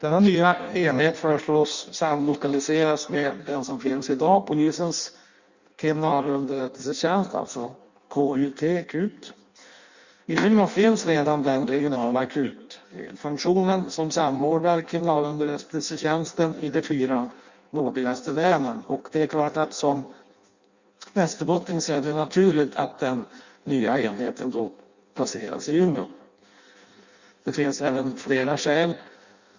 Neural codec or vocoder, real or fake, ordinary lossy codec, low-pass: codec, 16 kHz in and 24 kHz out, 0.6 kbps, FireRedTTS-2 codec; fake; Opus, 64 kbps; 7.2 kHz